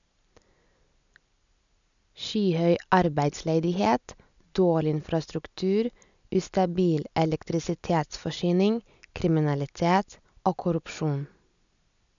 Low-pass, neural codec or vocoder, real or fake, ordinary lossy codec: 7.2 kHz; none; real; none